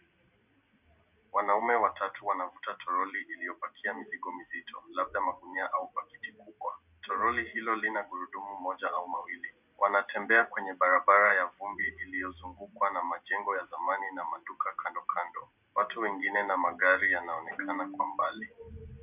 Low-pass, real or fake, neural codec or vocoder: 3.6 kHz; real; none